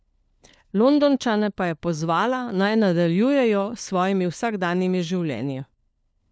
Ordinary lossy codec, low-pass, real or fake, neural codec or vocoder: none; none; fake; codec, 16 kHz, 4 kbps, FunCodec, trained on LibriTTS, 50 frames a second